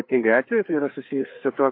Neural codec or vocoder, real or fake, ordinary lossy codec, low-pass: codec, 16 kHz, 4 kbps, FreqCodec, larger model; fake; AAC, 32 kbps; 5.4 kHz